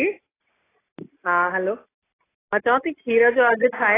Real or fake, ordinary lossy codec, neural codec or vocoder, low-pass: real; AAC, 16 kbps; none; 3.6 kHz